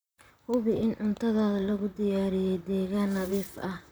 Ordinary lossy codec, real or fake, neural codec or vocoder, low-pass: none; fake; vocoder, 44.1 kHz, 128 mel bands, Pupu-Vocoder; none